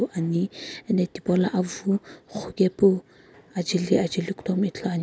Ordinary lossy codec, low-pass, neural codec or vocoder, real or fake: none; none; none; real